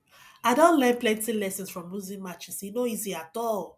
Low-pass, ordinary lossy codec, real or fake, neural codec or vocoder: 14.4 kHz; none; real; none